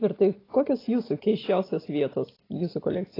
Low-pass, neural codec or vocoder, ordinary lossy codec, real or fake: 5.4 kHz; none; AAC, 24 kbps; real